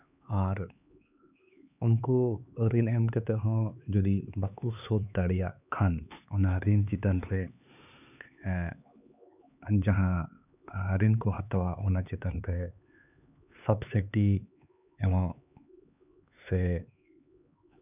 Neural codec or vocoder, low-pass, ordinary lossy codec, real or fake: codec, 16 kHz, 4 kbps, X-Codec, HuBERT features, trained on LibriSpeech; 3.6 kHz; none; fake